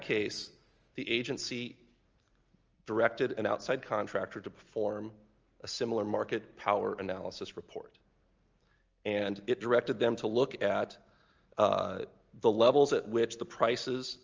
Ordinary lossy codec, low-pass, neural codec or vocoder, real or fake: Opus, 24 kbps; 7.2 kHz; none; real